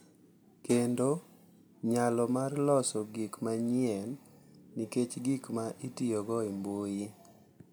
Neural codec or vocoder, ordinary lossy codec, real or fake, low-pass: none; none; real; none